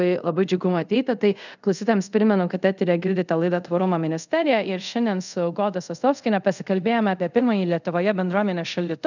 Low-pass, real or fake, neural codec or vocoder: 7.2 kHz; fake; codec, 24 kHz, 0.5 kbps, DualCodec